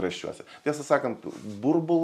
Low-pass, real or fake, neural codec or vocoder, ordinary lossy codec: 14.4 kHz; real; none; MP3, 96 kbps